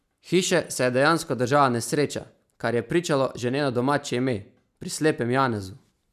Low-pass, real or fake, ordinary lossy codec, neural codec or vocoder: 14.4 kHz; real; none; none